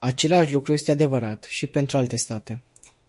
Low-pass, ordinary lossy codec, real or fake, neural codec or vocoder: 14.4 kHz; MP3, 48 kbps; fake; autoencoder, 48 kHz, 32 numbers a frame, DAC-VAE, trained on Japanese speech